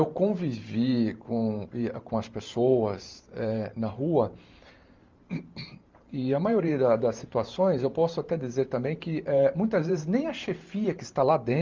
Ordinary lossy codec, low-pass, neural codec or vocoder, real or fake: Opus, 32 kbps; 7.2 kHz; vocoder, 44.1 kHz, 128 mel bands every 512 samples, BigVGAN v2; fake